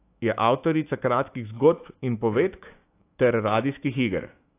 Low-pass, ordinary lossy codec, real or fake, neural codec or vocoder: 3.6 kHz; AAC, 24 kbps; fake; autoencoder, 48 kHz, 128 numbers a frame, DAC-VAE, trained on Japanese speech